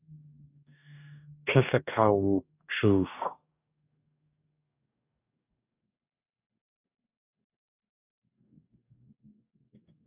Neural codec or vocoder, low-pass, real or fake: codec, 44.1 kHz, 2.6 kbps, DAC; 3.6 kHz; fake